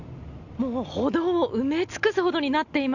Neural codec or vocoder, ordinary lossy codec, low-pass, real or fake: none; none; 7.2 kHz; real